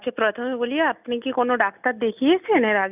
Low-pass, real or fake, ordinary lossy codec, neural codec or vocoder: 3.6 kHz; real; none; none